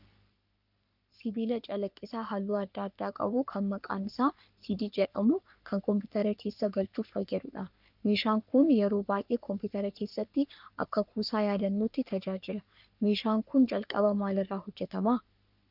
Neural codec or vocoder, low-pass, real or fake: codec, 44.1 kHz, 3.4 kbps, Pupu-Codec; 5.4 kHz; fake